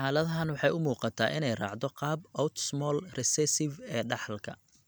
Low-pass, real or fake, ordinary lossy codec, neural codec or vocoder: none; real; none; none